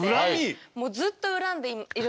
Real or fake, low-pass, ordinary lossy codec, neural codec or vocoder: real; none; none; none